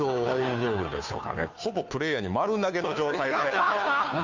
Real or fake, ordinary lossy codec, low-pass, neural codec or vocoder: fake; MP3, 64 kbps; 7.2 kHz; codec, 16 kHz, 2 kbps, FunCodec, trained on Chinese and English, 25 frames a second